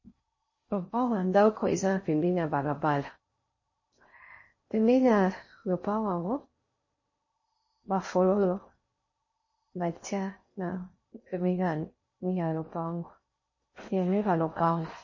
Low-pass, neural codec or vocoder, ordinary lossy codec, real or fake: 7.2 kHz; codec, 16 kHz in and 24 kHz out, 0.6 kbps, FocalCodec, streaming, 4096 codes; MP3, 32 kbps; fake